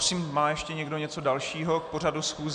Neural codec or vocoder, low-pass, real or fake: none; 9.9 kHz; real